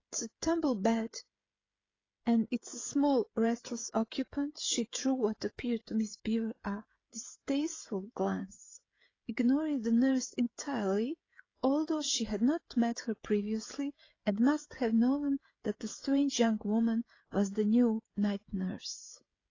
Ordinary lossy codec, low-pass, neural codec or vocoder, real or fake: AAC, 32 kbps; 7.2 kHz; codec, 16 kHz, 16 kbps, FreqCodec, smaller model; fake